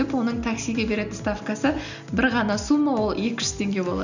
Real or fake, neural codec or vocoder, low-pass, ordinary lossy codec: real; none; 7.2 kHz; none